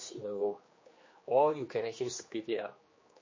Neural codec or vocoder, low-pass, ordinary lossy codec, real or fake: codec, 16 kHz, 2 kbps, X-Codec, HuBERT features, trained on general audio; 7.2 kHz; MP3, 32 kbps; fake